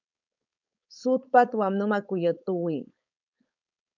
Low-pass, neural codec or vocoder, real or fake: 7.2 kHz; codec, 16 kHz, 4.8 kbps, FACodec; fake